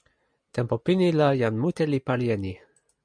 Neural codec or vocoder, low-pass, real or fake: none; 9.9 kHz; real